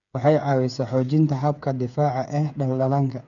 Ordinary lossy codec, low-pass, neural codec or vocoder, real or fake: none; 7.2 kHz; codec, 16 kHz, 8 kbps, FreqCodec, smaller model; fake